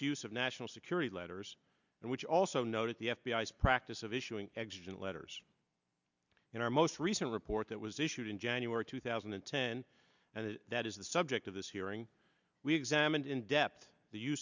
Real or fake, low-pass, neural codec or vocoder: real; 7.2 kHz; none